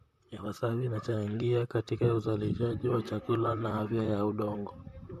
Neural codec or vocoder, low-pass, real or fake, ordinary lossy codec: vocoder, 44.1 kHz, 128 mel bands, Pupu-Vocoder; 14.4 kHz; fake; MP3, 64 kbps